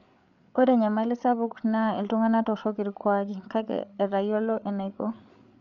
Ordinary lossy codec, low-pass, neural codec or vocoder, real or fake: none; 7.2 kHz; codec, 16 kHz, 8 kbps, FreqCodec, larger model; fake